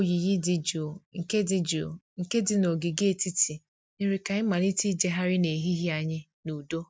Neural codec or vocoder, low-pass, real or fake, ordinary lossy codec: none; none; real; none